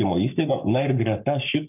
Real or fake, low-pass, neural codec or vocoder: fake; 3.6 kHz; codec, 44.1 kHz, 7.8 kbps, Pupu-Codec